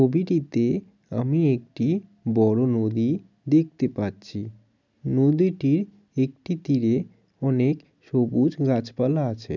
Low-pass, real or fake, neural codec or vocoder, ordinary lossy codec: 7.2 kHz; real; none; none